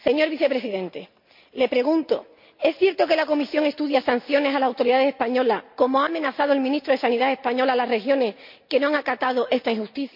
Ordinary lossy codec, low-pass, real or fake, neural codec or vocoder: MP3, 48 kbps; 5.4 kHz; real; none